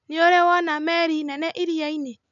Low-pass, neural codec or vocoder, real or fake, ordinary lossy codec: 7.2 kHz; none; real; none